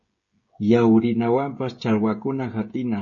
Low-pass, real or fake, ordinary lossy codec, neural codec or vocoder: 7.2 kHz; fake; MP3, 32 kbps; codec, 16 kHz, 16 kbps, FreqCodec, smaller model